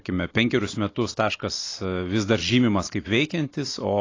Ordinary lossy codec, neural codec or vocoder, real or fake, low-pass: AAC, 32 kbps; none; real; 7.2 kHz